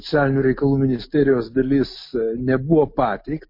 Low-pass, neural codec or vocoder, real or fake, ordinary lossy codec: 5.4 kHz; none; real; MP3, 32 kbps